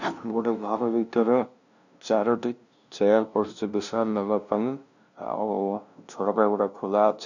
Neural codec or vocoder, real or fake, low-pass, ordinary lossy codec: codec, 16 kHz, 0.5 kbps, FunCodec, trained on LibriTTS, 25 frames a second; fake; 7.2 kHz; none